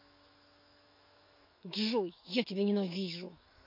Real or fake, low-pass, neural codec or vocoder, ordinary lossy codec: fake; 5.4 kHz; autoencoder, 48 kHz, 128 numbers a frame, DAC-VAE, trained on Japanese speech; MP3, 32 kbps